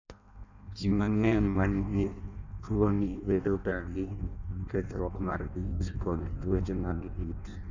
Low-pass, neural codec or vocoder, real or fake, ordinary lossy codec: 7.2 kHz; codec, 16 kHz in and 24 kHz out, 0.6 kbps, FireRedTTS-2 codec; fake; none